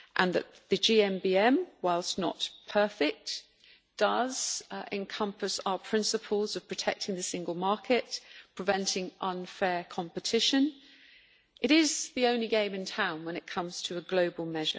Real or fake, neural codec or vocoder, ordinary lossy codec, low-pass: real; none; none; none